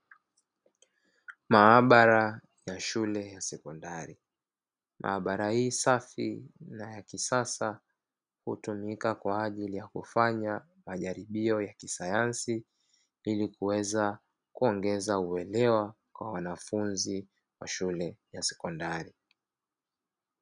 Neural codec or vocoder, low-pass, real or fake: none; 9.9 kHz; real